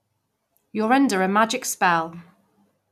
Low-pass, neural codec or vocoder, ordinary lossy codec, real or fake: 14.4 kHz; none; none; real